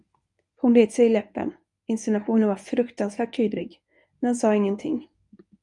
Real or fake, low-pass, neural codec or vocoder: fake; 10.8 kHz; codec, 24 kHz, 0.9 kbps, WavTokenizer, medium speech release version 1